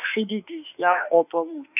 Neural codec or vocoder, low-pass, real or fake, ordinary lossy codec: autoencoder, 48 kHz, 32 numbers a frame, DAC-VAE, trained on Japanese speech; 3.6 kHz; fake; none